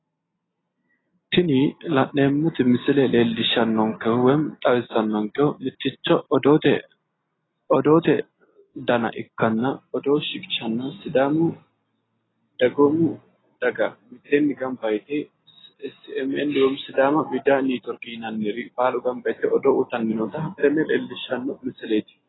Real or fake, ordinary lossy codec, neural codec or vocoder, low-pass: real; AAC, 16 kbps; none; 7.2 kHz